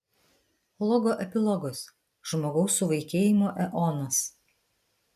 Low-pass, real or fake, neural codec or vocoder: 14.4 kHz; real; none